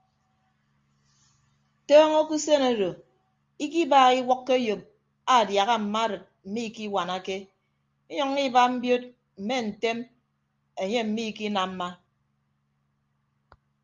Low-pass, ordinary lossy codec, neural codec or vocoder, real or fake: 7.2 kHz; Opus, 32 kbps; none; real